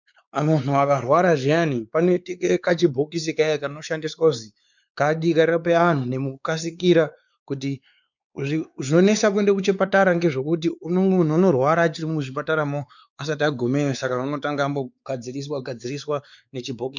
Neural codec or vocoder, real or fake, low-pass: codec, 16 kHz, 4 kbps, X-Codec, WavLM features, trained on Multilingual LibriSpeech; fake; 7.2 kHz